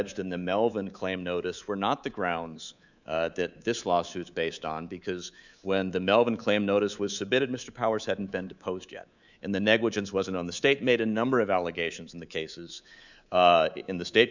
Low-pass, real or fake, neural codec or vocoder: 7.2 kHz; fake; codec, 24 kHz, 3.1 kbps, DualCodec